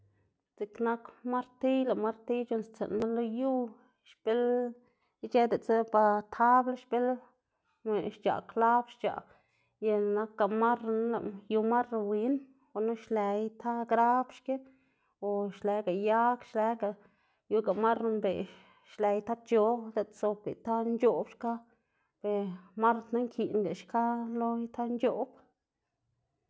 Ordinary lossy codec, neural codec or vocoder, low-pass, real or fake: none; none; none; real